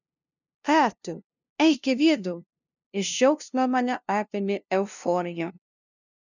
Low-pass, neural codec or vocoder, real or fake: 7.2 kHz; codec, 16 kHz, 0.5 kbps, FunCodec, trained on LibriTTS, 25 frames a second; fake